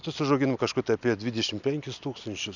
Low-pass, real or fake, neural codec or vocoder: 7.2 kHz; real; none